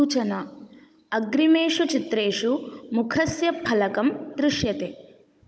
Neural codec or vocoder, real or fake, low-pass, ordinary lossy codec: codec, 16 kHz, 16 kbps, FunCodec, trained on Chinese and English, 50 frames a second; fake; none; none